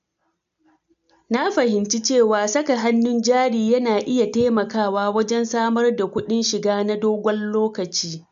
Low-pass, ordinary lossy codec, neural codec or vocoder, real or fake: 7.2 kHz; MP3, 64 kbps; none; real